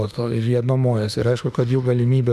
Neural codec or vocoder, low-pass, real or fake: autoencoder, 48 kHz, 32 numbers a frame, DAC-VAE, trained on Japanese speech; 14.4 kHz; fake